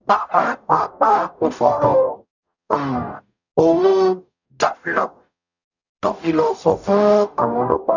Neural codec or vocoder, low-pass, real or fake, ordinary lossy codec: codec, 44.1 kHz, 0.9 kbps, DAC; 7.2 kHz; fake; none